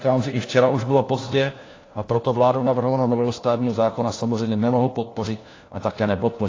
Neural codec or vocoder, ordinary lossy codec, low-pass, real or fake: codec, 16 kHz, 1 kbps, FunCodec, trained on LibriTTS, 50 frames a second; AAC, 32 kbps; 7.2 kHz; fake